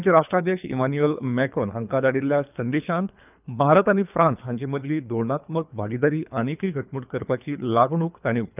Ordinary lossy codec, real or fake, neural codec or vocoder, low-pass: none; fake; codec, 24 kHz, 3 kbps, HILCodec; 3.6 kHz